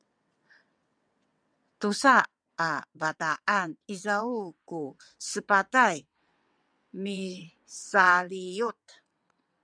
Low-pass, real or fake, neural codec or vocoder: 9.9 kHz; fake; vocoder, 22.05 kHz, 80 mel bands, WaveNeXt